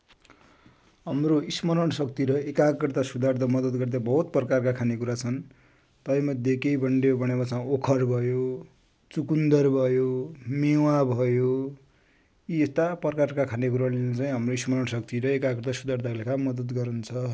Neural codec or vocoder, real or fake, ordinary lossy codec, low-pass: none; real; none; none